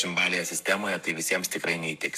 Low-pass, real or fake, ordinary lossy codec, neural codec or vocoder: 14.4 kHz; fake; MP3, 96 kbps; codec, 44.1 kHz, 7.8 kbps, Pupu-Codec